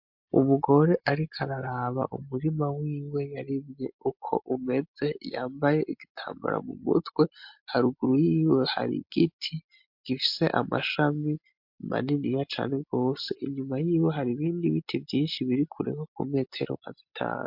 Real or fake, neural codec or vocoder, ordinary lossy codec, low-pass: real; none; MP3, 48 kbps; 5.4 kHz